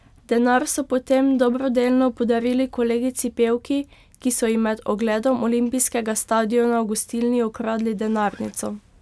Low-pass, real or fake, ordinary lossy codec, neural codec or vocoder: none; real; none; none